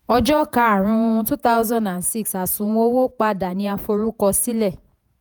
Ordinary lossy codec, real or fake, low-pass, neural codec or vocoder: none; fake; none; vocoder, 48 kHz, 128 mel bands, Vocos